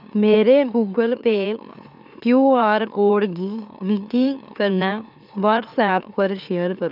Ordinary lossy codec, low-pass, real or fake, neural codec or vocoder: none; 5.4 kHz; fake; autoencoder, 44.1 kHz, a latent of 192 numbers a frame, MeloTTS